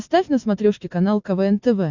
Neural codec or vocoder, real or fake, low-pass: none; real; 7.2 kHz